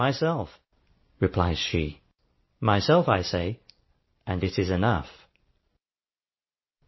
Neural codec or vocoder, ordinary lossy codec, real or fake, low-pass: none; MP3, 24 kbps; real; 7.2 kHz